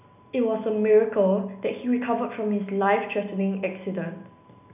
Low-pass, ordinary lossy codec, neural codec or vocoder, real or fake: 3.6 kHz; none; none; real